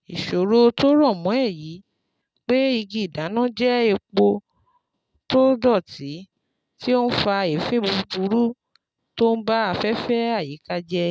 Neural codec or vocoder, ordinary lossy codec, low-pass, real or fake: none; none; none; real